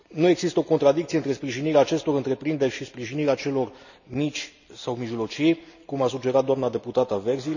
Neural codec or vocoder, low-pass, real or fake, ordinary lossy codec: none; 7.2 kHz; real; none